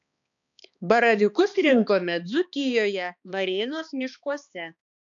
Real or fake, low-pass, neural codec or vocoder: fake; 7.2 kHz; codec, 16 kHz, 2 kbps, X-Codec, HuBERT features, trained on balanced general audio